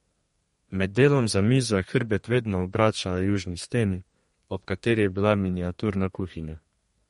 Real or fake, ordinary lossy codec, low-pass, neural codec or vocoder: fake; MP3, 48 kbps; 14.4 kHz; codec, 32 kHz, 1.9 kbps, SNAC